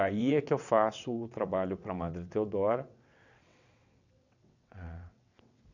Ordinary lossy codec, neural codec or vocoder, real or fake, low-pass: none; vocoder, 22.05 kHz, 80 mel bands, WaveNeXt; fake; 7.2 kHz